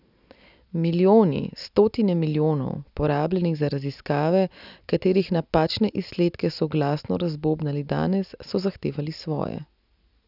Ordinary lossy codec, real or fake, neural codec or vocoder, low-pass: none; real; none; 5.4 kHz